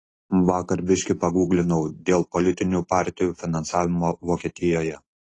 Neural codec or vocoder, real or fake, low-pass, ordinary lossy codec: none; real; 10.8 kHz; AAC, 32 kbps